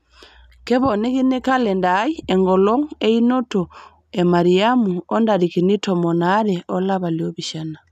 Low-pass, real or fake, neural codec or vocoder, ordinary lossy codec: 14.4 kHz; real; none; none